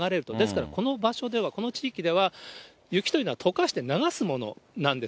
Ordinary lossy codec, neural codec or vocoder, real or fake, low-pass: none; none; real; none